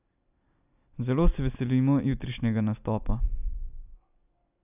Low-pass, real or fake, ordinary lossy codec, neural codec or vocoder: 3.6 kHz; real; none; none